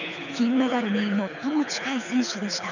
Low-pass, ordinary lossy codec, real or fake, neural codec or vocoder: 7.2 kHz; none; fake; codec, 24 kHz, 6 kbps, HILCodec